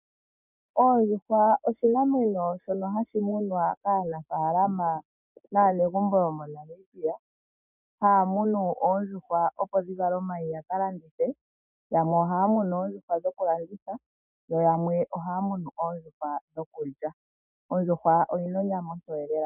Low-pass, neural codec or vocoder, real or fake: 3.6 kHz; none; real